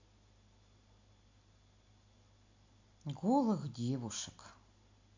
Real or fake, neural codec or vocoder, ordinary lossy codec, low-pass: real; none; AAC, 48 kbps; 7.2 kHz